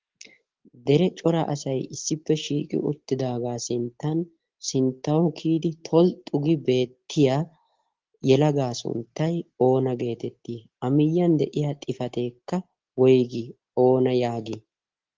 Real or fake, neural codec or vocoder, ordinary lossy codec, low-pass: real; none; Opus, 16 kbps; 7.2 kHz